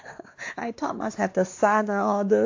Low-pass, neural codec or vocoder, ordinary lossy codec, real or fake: 7.2 kHz; codec, 16 kHz, 2 kbps, X-Codec, WavLM features, trained on Multilingual LibriSpeech; AAC, 48 kbps; fake